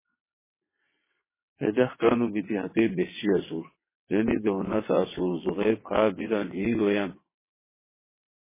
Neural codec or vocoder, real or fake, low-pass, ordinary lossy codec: vocoder, 22.05 kHz, 80 mel bands, WaveNeXt; fake; 3.6 kHz; MP3, 16 kbps